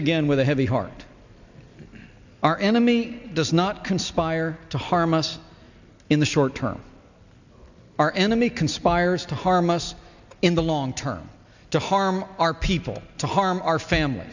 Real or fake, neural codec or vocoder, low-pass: real; none; 7.2 kHz